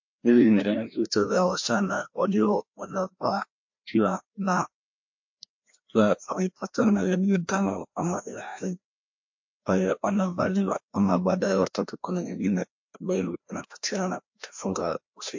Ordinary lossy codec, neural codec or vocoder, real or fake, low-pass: MP3, 48 kbps; codec, 16 kHz, 1 kbps, FreqCodec, larger model; fake; 7.2 kHz